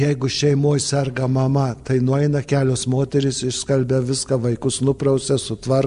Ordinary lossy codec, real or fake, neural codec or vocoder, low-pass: MP3, 64 kbps; real; none; 10.8 kHz